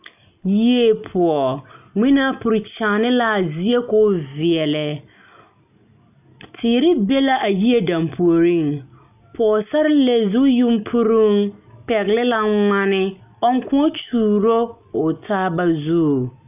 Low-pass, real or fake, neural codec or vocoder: 3.6 kHz; real; none